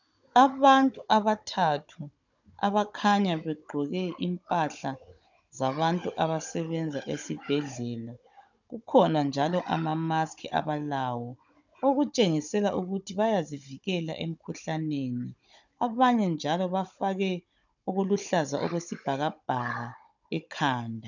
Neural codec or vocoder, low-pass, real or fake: codec, 16 kHz, 16 kbps, FunCodec, trained on Chinese and English, 50 frames a second; 7.2 kHz; fake